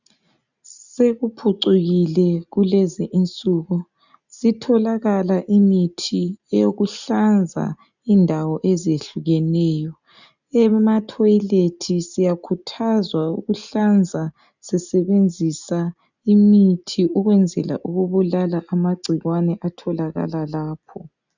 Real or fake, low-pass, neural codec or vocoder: real; 7.2 kHz; none